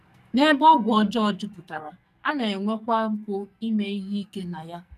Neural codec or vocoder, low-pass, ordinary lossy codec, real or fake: codec, 44.1 kHz, 2.6 kbps, SNAC; 14.4 kHz; none; fake